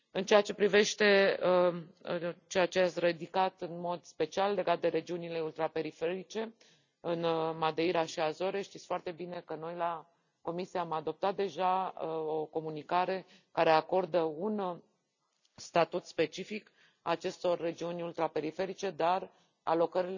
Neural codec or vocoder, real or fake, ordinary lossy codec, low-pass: none; real; none; 7.2 kHz